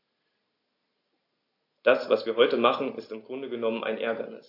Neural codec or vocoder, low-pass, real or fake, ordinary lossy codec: none; 5.4 kHz; real; AAC, 32 kbps